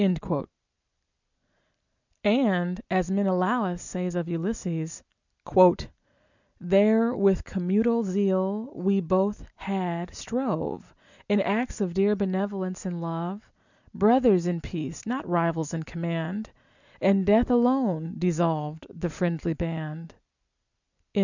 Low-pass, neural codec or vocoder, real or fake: 7.2 kHz; none; real